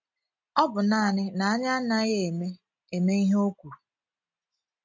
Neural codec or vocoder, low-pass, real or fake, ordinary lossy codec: none; 7.2 kHz; real; MP3, 48 kbps